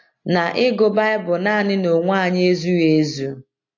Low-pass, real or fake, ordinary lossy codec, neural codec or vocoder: 7.2 kHz; real; AAC, 48 kbps; none